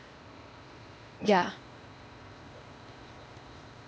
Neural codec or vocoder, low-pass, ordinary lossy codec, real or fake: codec, 16 kHz, 0.8 kbps, ZipCodec; none; none; fake